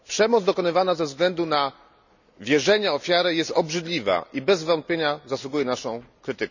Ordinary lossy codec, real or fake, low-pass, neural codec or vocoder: none; real; 7.2 kHz; none